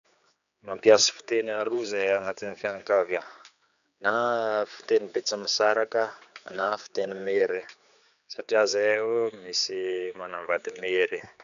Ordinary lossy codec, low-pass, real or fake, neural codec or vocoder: none; 7.2 kHz; fake; codec, 16 kHz, 4 kbps, X-Codec, HuBERT features, trained on general audio